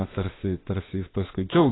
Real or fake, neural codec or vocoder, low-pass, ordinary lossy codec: fake; autoencoder, 48 kHz, 32 numbers a frame, DAC-VAE, trained on Japanese speech; 7.2 kHz; AAC, 16 kbps